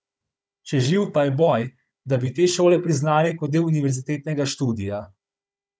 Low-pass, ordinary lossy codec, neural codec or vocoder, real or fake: none; none; codec, 16 kHz, 16 kbps, FunCodec, trained on Chinese and English, 50 frames a second; fake